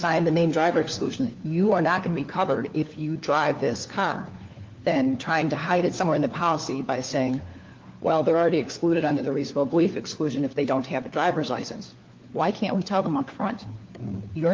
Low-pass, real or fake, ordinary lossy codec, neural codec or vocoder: 7.2 kHz; fake; Opus, 32 kbps; codec, 16 kHz, 4 kbps, FunCodec, trained on LibriTTS, 50 frames a second